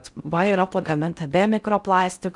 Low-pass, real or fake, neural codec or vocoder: 10.8 kHz; fake; codec, 16 kHz in and 24 kHz out, 0.6 kbps, FocalCodec, streaming, 4096 codes